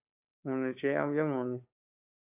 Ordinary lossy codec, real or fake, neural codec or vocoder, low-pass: AAC, 32 kbps; fake; codec, 16 kHz, 2 kbps, FunCodec, trained on Chinese and English, 25 frames a second; 3.6 kHz